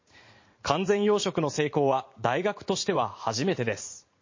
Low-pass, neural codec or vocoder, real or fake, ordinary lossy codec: 7.2 kHz; vocoder, 44.1 kHz, 80 mel bands, Vocos; fake; MP3, 32 kbps